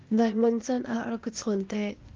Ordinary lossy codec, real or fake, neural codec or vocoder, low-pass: Opus, 24 kbps; fake; codec, 16 kHz, 0.8 kbps, ZipCodec; 7.2 kHz